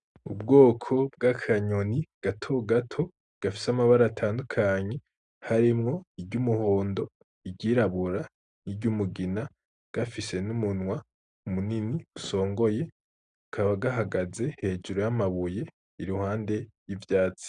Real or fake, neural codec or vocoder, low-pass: real; none; 9.9 kHz